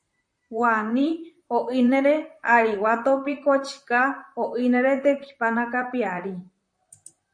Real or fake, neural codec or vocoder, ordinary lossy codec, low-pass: fake; vocoder, 44.1 kHz, 128 mel bands, Pupu-Vocoder; MP3, 48 kbps; 9.9 kHz